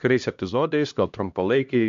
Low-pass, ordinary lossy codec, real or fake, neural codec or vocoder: 7.2 kHz; MP3, 64 kbps; fake; codec, 16 kHz, 1 kbps, X-Codec, HuBERT features, trained on LibriSpeech